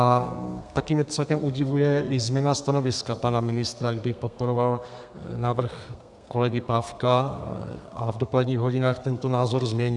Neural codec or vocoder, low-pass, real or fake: codec, 32 kHz, 1.9 kbps, SNAC; 10.8 kHz; fake